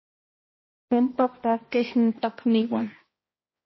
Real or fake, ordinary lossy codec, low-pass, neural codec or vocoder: fake; MP3, 24 kbps; 7.2 kHz; codec, 16 kHz, 1 kbps, X-Codec, HuBERT features, trained on balanced general audio